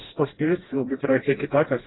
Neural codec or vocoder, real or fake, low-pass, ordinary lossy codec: codec, 16 kHz, 1 kbps, FreqCodec, smaller model; fake; 7.2 kHz; AAC, 16 kbps